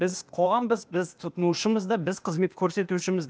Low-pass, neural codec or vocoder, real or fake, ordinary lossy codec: none; codec, 16 kHz, 0.8 kbps, ZipCodec; fake; none